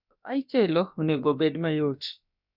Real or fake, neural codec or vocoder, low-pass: fake; codec, 16 kHz, about 1 kbps, DyCAST, with the encoder's durations; 5.4 kHz